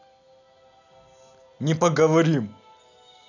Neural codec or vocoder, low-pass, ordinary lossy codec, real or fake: none; 7.2 kHz; none; real